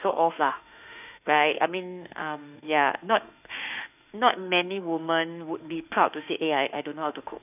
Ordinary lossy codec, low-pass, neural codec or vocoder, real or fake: none; 3.6 kHz; autoencoder, 48 kHz, 32 numbers a frame, DAC-VAE, trained on Japanese speech; fake